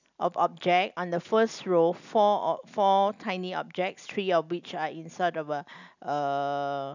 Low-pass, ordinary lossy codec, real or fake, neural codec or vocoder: 7.2 kHz; none; real; none